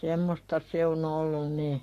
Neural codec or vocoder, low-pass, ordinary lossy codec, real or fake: codec, 44.1 kHz, 7.8 kbps, Pupu-Codec; 14.4 kHz; AAC, 48 kbps; fake